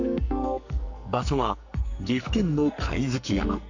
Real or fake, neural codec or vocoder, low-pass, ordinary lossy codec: fake; codec, 44.1 kHz, 2.6 kbps, SNAC; 7.2 kHz; AAC, 48 kbps